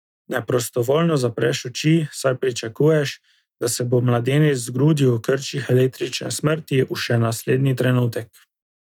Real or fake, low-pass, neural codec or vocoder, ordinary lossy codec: real; 19.8 kHz; none; none